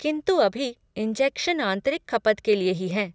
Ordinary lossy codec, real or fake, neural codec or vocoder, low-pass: none; real; none; none